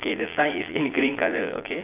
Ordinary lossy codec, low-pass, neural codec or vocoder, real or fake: AAC, 24 kbps; 3.6 kHz; vocoder, 22.05 kHz, 80 mel bands, Vocos; fake